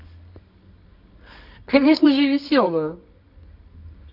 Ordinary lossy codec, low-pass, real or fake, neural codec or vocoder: none; 5.4 kHz; fake; codec, 24 kHz, 0.9 kbps, WavTokenizer, medium music audio release